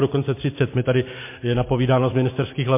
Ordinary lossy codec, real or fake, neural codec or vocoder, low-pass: MP3, 24 kbps; real; none; 3.6 kHz